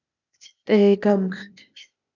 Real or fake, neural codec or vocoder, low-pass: fake; codec, 16 kHz, 0.8 kbps, ZipCodec; 7.2 kHz